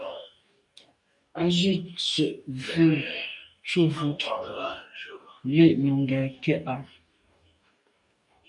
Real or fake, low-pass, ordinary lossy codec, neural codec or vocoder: fake; 10.8 kHz; MP3, 96 kbps; codec, 44.1 kHz, 2.6 kbps, DAC